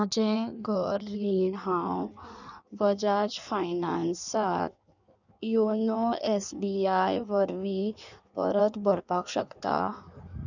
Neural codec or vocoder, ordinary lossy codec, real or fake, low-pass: codec, 16 kHz in and 24 kHz out, 1.1 kbps, FireRedTTS-2 codec; none; fake; 7.2 kHz